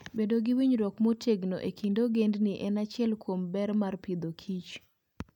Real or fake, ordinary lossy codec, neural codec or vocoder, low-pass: real; none; none; 19.8 kHz